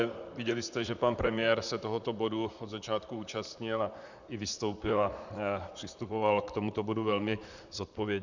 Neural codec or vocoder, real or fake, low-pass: vocoder, 44.1 kHz, 128 mel bands, Pupu-Vocoder; fake; 7.2 kHz